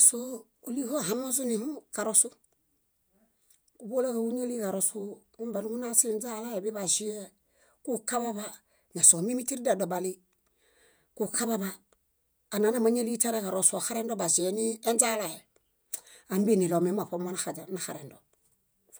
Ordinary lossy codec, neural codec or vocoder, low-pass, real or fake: none; vocoder, 48 kHz, 128 mel bands, Vocos; none; fake